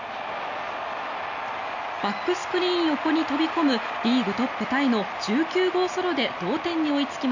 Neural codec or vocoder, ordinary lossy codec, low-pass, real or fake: none; none; 7.2 kHz; real